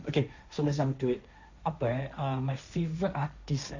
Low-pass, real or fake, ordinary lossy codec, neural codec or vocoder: 7.2 kHz; fake; Opus, 64 kbps; codec, 16 kHz, 1.1 kbps, Voila-Tokenizer